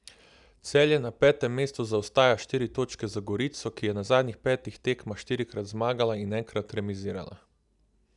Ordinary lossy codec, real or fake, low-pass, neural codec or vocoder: none; real; 10.8 kHz; none